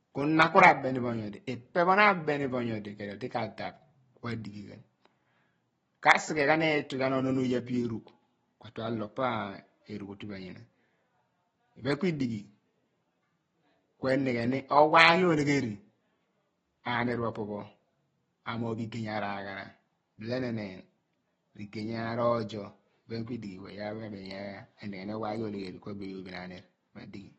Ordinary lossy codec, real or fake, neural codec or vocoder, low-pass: AAC, 24 kbps; real; none; 10.8 kHz